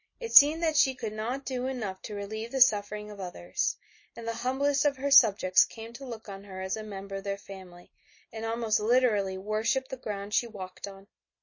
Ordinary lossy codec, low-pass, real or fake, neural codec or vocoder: MP3, 32 kbps; 7.2 kHz; real; none